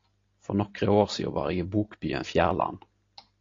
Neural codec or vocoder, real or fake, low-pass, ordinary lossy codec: none; real; 7.2 kHz; AAC, 32 kbps